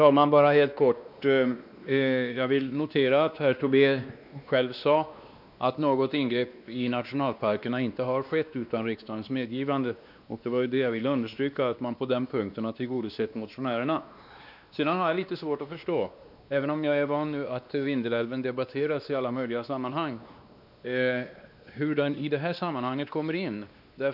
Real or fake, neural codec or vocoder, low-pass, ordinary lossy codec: fake; codec, 16 kHz, 2 kbps, X-Codec, WavLM features, trained on Multilingual LibriSpeech; 5.4 kHz; none